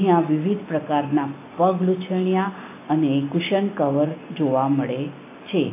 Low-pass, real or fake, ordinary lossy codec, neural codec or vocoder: 3.6 kHz; real; AAC, 24 kbps; none